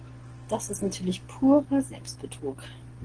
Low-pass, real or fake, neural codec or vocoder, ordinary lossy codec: 9.9 kHz; real; none; Opus, 16 kbps